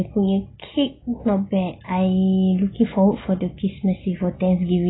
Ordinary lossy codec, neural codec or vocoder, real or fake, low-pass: AAC, 16 kbps; none; real; 7.2 kHz